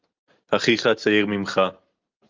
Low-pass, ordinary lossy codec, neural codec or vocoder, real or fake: 7.2 kHz; Opus, 32 kbps; none; real